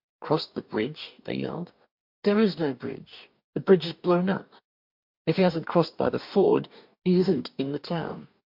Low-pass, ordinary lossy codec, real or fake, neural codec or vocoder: 5.4 kHz; MP3, 48 kbps; fake; codec, 44.1 kHz, 2.6 kbps, DAC